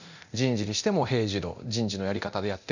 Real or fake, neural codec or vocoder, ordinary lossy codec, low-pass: fake; codec, 24 kHz, 0.9 kbps, DualCodec; none; 7.2 kHz